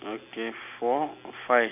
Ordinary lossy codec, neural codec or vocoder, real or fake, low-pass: none; none; real; 3.6 kHz